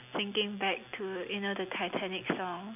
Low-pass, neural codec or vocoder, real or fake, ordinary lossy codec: 3.6 kHz; none; real; none